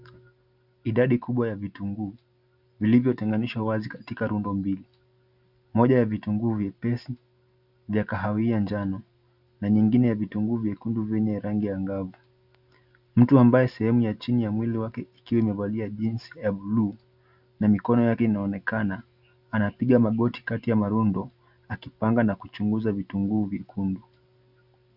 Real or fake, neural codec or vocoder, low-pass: real; none; 5.4 kHz